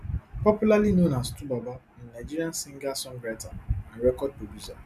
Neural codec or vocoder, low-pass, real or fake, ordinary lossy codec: none; 14.4 kHz; real; none